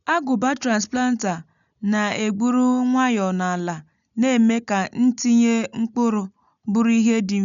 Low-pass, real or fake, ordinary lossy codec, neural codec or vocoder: 7.2 kHz; real; none; none